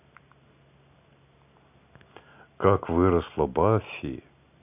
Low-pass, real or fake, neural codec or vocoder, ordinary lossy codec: 3.6 kHz; real; none; none